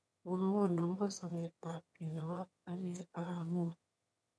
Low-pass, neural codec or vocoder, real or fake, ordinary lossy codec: none; autoencoder, 22.05 kHz, a latent of 192 numbers a frame, VITS, trained on one speaker; fake; none